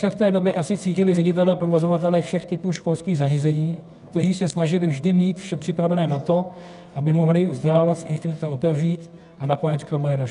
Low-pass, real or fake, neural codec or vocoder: 10.8 kHz; fake; codec, 24 kHz, 0.9 kbps, WavTokenizer, medium music audio release